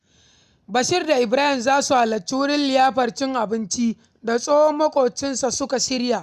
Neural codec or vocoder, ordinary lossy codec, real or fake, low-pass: none; none; real; 14.4 kHz